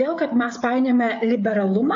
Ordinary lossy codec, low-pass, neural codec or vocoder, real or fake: AAC, 48 kbps; 7.2 kHz; codec, 16 kHz, 16 kbps, FreqCodec, smaller model; fake